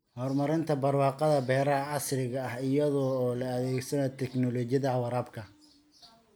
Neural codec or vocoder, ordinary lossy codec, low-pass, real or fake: none; none; none; real